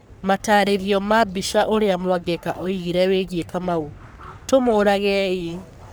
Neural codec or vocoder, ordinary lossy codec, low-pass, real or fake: codec, 44.1 kHz, 3.4 kbps, Pupu-Codec; none; none; fake